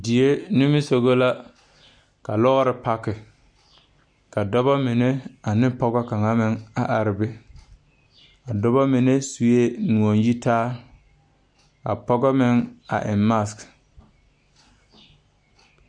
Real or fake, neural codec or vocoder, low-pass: real; none; 9.9 kHz